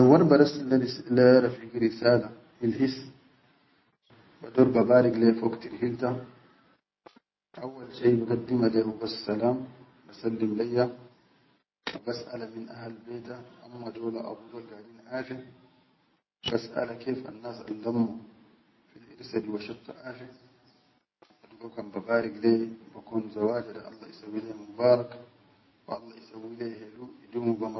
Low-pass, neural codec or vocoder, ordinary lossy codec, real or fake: 7.2 kHz; none; MP3, 24 kbps; real